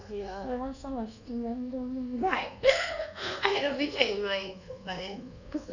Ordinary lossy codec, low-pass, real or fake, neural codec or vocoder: none; 7.2 kHz; fake; codec, 24 kHz, 1.2 kbps, DualCodec